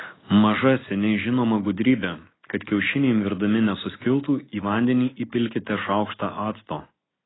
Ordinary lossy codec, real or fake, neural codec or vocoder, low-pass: AAC, 16 kbps; real; none; 7.2 kHz